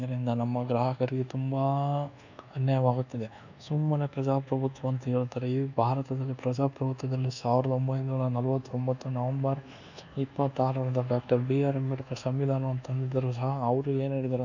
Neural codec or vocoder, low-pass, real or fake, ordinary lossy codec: codec, 24 kHz, 1.2 kbps, DualCodec; 7.2 kHz; fake; none